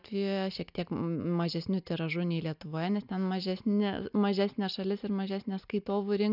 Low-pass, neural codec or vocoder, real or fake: 5.4 kHz; none; real